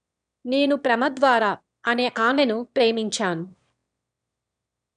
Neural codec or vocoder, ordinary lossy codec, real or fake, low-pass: autoencoder, 22.05 kHz, a latent of 192 numbers a frame, VITS, trained on one speaker; none; fake; 9.9 kHz